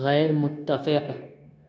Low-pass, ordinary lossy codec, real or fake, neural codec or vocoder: none; none; fake; codec, 16 kHz, 0.9 kbps, LongCat-Audio-Codec